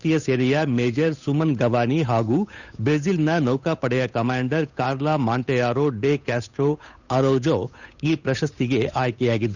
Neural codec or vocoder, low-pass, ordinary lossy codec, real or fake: codec, 16 kHz, 8 kbps, FunCodec, trained on Chinese and English, 25 frames a second; 7.2 kHz; none; fake